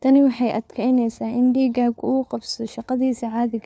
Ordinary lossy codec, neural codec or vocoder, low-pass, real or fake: none; codec, 16 kHz, 4 kbps, FunCodec, trained on LibriTTS, 50 frames a second; none; fake